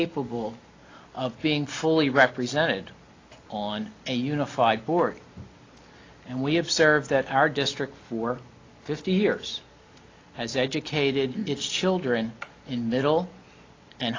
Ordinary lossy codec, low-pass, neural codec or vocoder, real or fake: AAC, 32 kbps; 7.2 kHz; none; real